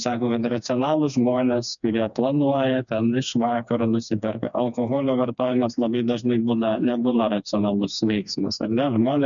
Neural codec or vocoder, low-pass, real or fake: codec, 16 kHz, 2 kbps, FreqCodec, smaller model; 7.2 kHz; fake